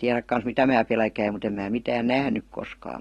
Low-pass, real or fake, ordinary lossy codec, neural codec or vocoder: 19.8 kHz; real; AAC, 32 kbps; none